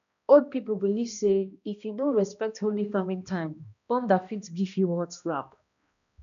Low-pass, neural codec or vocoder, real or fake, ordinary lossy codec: 7.2 kHz; codec, 16 kHz, 1 kbps, X-Codec, HuBERT features, trained on balanced general audio; fake; none